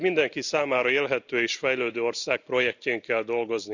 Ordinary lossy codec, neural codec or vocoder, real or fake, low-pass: none; none; real; 7.2 kHz